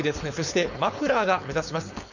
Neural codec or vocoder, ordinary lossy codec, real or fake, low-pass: codec, 16 kHz, 4.8 kbps, FACodec; none; fake; 7.2 kHz